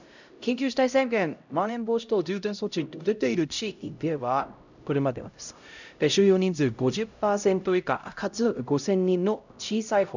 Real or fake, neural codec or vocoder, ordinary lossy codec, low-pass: fake; codec, 16 kHz, 0.5 kbps, X-Codec, HuBERT features, trained on LibriSpeech; none; 7.2 kHz